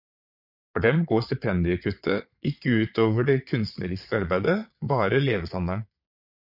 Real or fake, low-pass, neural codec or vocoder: fake; 5.4 kHz; vocoder, 22.05 kHz, 80 mel bands, Vocos